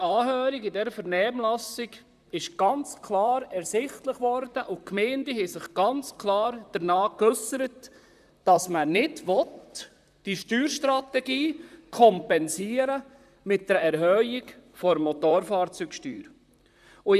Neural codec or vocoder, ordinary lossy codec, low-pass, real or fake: vocoder, 44.1 kHz, 128 mel bands, Pupu-Vocoder; none; 14.4 kHz; fake